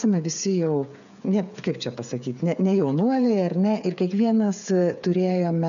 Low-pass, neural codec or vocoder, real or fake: 7.2 kHz; codec, 16 kHz, 8 kbps, FreqCodec, smaller model; fake